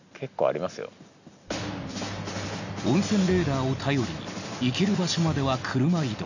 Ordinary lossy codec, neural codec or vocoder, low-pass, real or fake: none; none; 7.2 kHz; real